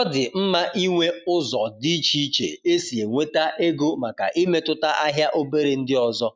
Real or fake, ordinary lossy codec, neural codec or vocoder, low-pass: real; none; none; none